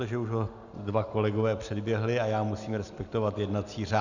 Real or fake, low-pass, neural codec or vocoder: real; 7.2 kHz; none